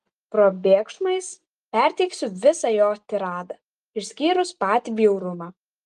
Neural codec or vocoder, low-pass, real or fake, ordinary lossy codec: none; 10.8 kHz; real; Opus, 32 kbps